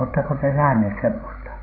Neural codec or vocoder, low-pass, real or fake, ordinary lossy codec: codec, 16 kHz, 16 kbps, FreqCodec, larger model; 5.4 kHz; fake; AAC, 24 kbps